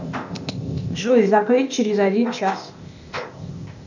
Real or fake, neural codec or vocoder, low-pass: fake; codec, 16 kHz, 0.8 kbps, ZipCodec; 7.2 kHz